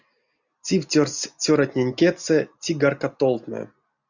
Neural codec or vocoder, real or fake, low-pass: none; real; 7.2 kHz